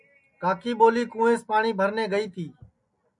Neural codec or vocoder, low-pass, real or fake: none; 10.8 kHz; real